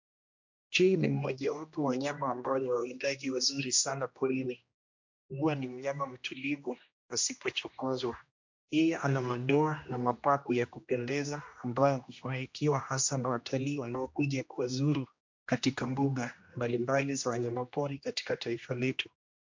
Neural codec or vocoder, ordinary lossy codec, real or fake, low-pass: codec, 16 kHz, 1 kbps, X-Codec, HuBERT features, trained on general audio; MP3, 48 kbps; fake; 7.2 kHz